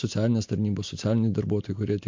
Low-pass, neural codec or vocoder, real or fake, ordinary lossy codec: 7.2 kHz; none; real; MP3, 48 kbps